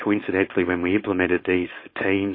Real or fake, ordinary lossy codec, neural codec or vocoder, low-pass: fake; MP3, 24 kbps; codec, 16 kHz, 4.8 kbps, FACodec; 5.4 kHz